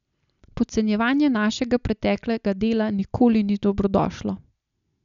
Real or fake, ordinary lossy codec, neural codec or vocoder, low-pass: real; none; none; 7.2 kHz